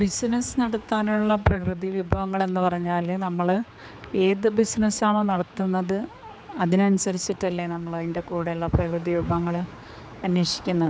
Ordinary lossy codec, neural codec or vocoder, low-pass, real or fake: none; codec, 16 kHz, 4 kbps, X-Codec, HuBERT features, trained on general audio; none; fake